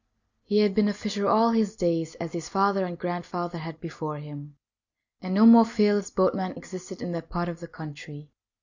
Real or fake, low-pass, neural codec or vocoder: real; 7.2 kHz; none